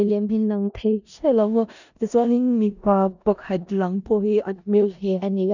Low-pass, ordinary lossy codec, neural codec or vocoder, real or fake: 7.2 kHz; none; codec, 16 kHz in and 24 kHz out, 0.4 kbps, LongCat-Audio-Codec, four codebook decoder; fake